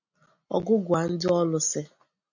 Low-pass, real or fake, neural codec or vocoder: 7.2 kHz; real; none